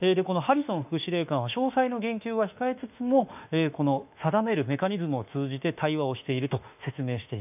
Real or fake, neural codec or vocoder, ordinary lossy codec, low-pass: fake; autoencoder, 48 kHz, 32 numbers a frame, DAC-VAE, trained on Japanese speech; none; 3.6 kHz